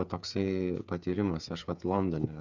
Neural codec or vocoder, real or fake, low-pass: codec, 16 kHz, 8 kbps, FreqCodec, smaller model; fake; 7.2 kHz